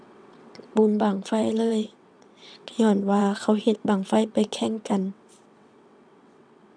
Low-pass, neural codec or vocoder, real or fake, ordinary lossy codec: 9.9 kHz; vocoder, 22.05 kHz, 80 mel bands, WaveNeXt; fake; none